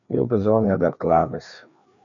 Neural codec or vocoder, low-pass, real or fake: codec, 16 kHz, 2 kbps, FreqCodec, larger model; 7.2 kHz; fake